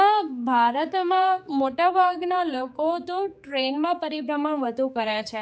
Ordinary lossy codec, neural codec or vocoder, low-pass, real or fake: none; codec, 16 kHz, 4 kbps, X-Codec, HuBERT features, trained on general audio; none; fake